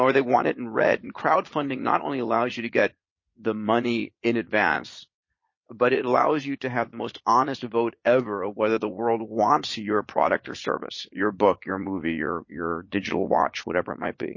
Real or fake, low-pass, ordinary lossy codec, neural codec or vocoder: fake; 7.2 kHz; MP3, 32 kbps; vocoder, 22.05 kHz, 80 mel bands, Vocos